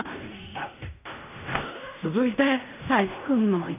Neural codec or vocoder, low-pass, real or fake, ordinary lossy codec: codec, 16 kHz in and 24 kHz out, 0.4 kbps, LongCat-Audio-Codec, fine tuned four codebook decoder; 3.6 kHz; fake; none